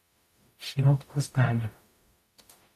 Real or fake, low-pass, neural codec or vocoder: fake; 14.4 kHz; codec, 44.1 kHz, 0.9 kbps, DAC